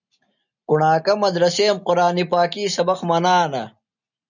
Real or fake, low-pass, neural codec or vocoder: real; 7.2 kHz; none